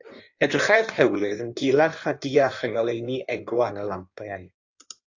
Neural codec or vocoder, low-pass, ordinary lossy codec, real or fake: codec, 16 kHz in and 24 kHz out, 1.1 kbps, FireRedTTS-2 codec; 7.2 kHz; AAC, 48 kbps; fake